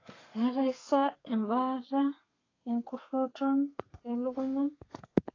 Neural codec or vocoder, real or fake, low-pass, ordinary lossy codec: codec, 32 kHz, 1.9 kbps, SNAC; fake; 7.2 kHz; AAC, 32 kbps